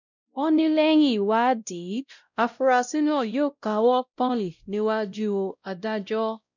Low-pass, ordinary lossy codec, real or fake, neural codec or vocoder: 7.2 kHz; none; fake; codec, 16 kHz, 0.5 kbps, X-Codec, WavLM features, trained on Multilingual LibriSpeech